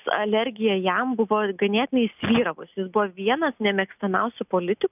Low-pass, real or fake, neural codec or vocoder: 3.6 kHz; real; none